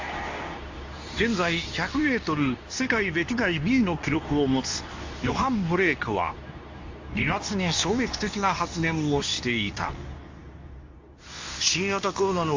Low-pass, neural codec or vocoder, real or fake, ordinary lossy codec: 7.2 kHz; codec, 24 kHz, 0.9 kbps, WavTokenizer, medium speech release version 2; fake; AAC, 48 kbps